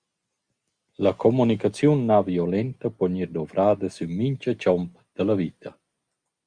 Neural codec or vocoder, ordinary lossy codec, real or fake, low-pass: none; Opus, 64 kbps; real; 9.9 kHz